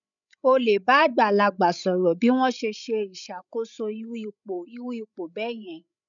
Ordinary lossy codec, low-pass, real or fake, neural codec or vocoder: none; 7.2 kHz; fake; codec, 16 kHz, 16 kbps, FreqCodec, larger model